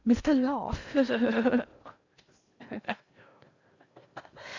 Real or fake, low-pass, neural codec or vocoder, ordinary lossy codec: fake; 7.2 kHz; codec, 16 kHz in and 24 kHz out, 0.6 kbps, FocalCodec, streaming, 2048 codes; Opus, 64 kbps